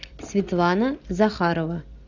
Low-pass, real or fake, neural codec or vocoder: 7.2 kHz; real; none